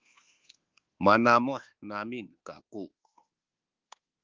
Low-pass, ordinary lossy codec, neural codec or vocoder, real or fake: 7.2 kHz; Opus, 16 kbps; codec, 24 kHz, 1.2 kbps, DualCodec; fake